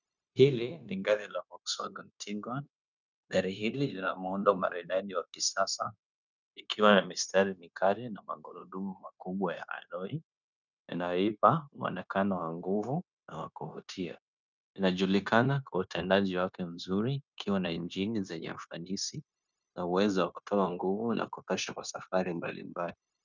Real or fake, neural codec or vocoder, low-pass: fake; codec, 16 kHz, 0.9 kbps, LongCat-Audio-Codec; 7.2 kHz